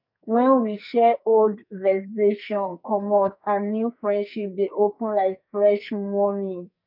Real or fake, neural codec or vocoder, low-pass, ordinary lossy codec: fake; codec, 44.1 kHz, 2.6 kbps, SNAC; 5.4 kHz; none